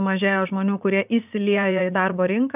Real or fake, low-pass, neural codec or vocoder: fake; 3.6 kHz; vocoder, 44.1 kHz, 80 mel bands, Vocos